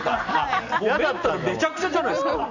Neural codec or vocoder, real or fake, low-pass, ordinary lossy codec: none; real; 7.2 kHz; none